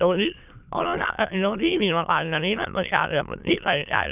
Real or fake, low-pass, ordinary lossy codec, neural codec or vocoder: fake; 3.6 kHz; none; autoencoder, 22.05 kHz, a latent of 192 numbers a frame, VITS, trained on many speakers